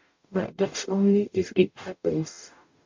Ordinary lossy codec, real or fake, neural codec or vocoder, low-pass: MP3, 64 kbps; fake; codec, 44.1 kHz, 0.9 kbps, DAC; 7.2 kHz